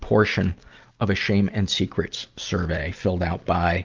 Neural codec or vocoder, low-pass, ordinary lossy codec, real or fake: none; 7.2 kHz; Opus, 32 kbps; real